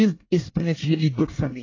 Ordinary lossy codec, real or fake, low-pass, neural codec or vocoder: AAC, 32 kbps; fake; 7.2 kHz; codec, 24 kHz, 1 kbps, SNAC